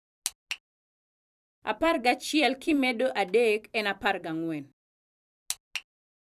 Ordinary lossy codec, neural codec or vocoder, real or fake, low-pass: none; vocoder, 44.1 kHz, 128 mel bands every 256 samples, BigVGAN v2; fake; 14.4 kHz